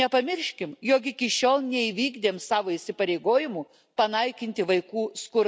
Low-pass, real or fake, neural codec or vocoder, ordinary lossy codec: none; real; none; none